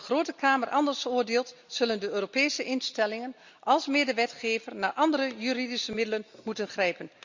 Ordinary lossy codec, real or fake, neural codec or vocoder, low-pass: Opus, 64 kbps; real; none; 7.2 kHz